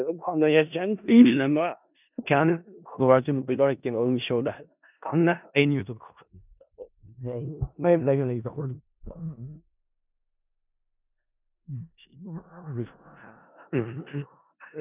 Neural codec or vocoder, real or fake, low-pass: codec, 16 kHz in and 24 kHz out, 0.4 kbps, LongCat-Audio-Codec, four codebook decoder; fake; 3.6 kHz